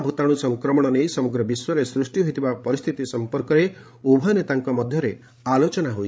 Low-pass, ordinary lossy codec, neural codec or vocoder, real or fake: none; none; codec, 16 kHz, 16 kbps, FreqCodec, larger model; fake